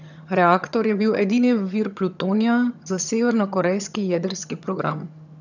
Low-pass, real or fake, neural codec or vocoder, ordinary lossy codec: 7.2 kHz; fake; vocoder, 22.05 kHz, 80 mel bands, HiFi-GAN; none